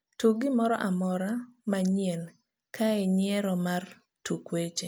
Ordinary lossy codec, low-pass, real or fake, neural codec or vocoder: none; none; real; none